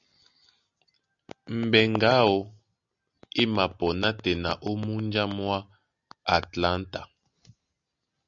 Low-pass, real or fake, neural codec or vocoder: 7.2 kHz; real; none